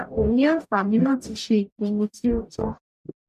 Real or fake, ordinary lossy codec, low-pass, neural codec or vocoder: fake; none; 14.4 kHz; codec, 44.1 kHz, 0.9 kbps, DAC